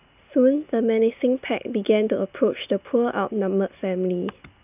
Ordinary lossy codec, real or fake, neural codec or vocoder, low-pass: none; real; none; 3.6 kHz